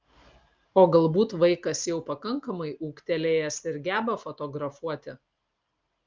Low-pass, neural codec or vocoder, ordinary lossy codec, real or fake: 7.2 kHz; none; Opus, 24 kbps; real